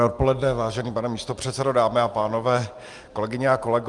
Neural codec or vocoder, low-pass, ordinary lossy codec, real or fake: none; 10.8 kHz; Opus, 32 kbps; real